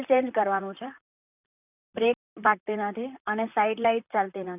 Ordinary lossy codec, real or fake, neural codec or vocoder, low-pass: none; fake; vocoder, 44.1 kHz, 128 mel bands, Pupu-Vocoder; 3.6 kHz